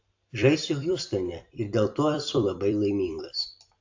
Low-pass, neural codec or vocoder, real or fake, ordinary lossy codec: 7.2 kHz; vocoder, 44.1 kHz, 128 mel bands every 256 samples, BigVGAN v2; fake; AAC, 48 kbps